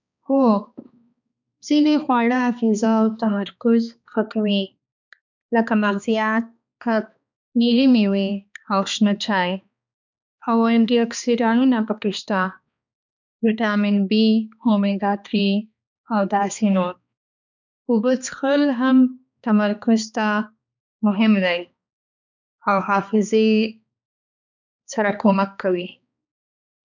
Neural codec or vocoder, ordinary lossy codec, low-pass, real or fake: codec, 16 kHz, 2 kbps, X-Codec, HuBERT features, trained on balanced general audio; none; 7.2 kHz; fake